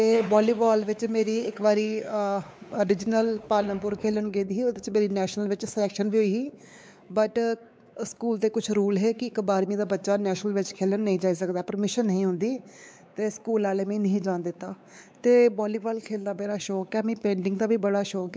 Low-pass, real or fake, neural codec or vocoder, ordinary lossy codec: none; fake; codec, 16 kHz, 4 kbps, X-Codec, WavLM features, trained on Multilingual LibriSpeech; none